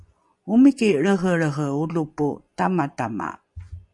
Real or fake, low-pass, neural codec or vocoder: fake; 10.8 kHz; vocoder, 24 kHz, 100 mel bands, Vocos